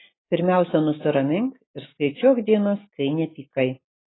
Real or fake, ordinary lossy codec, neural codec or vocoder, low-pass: real; AAC, 16 kbps; none; 7.2 kHz